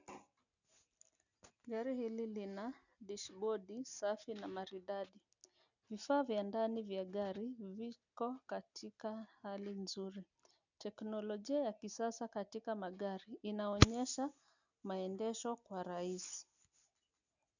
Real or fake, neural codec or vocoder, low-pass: real; none; 7.2 kHz